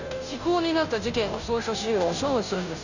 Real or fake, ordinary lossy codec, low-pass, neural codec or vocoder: fake; none; 7.2 kHz; codec, 16 kHz, 0.5 kbps, FunCodec, trained on Chinese and English, 25 frames a second